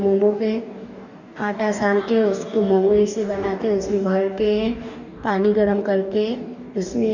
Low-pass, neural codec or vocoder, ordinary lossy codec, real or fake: 7.2 kHz; codec, 44.1 kHz, 2.6 kbps, DAC; none; fake